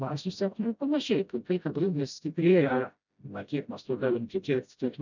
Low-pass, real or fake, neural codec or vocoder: 7.2 kHz; fake; codec, 16 kHz, 0.5 kbps, FreqCodec, smaller model